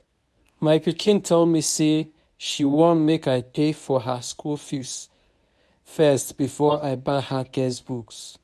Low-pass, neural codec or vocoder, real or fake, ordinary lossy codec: none; codec, 24 kHz, 0.9 kbps, WavTokenizer, medium speech release version 1; fake; none